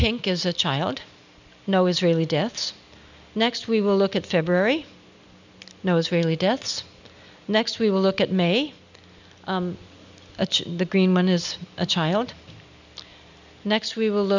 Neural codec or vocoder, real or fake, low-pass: none; real; 7.2 kHz